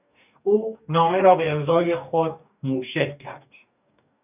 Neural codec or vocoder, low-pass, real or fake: codec, 44.1 kHz, 2.6 kbps, DAC; 3.6 kHz; fake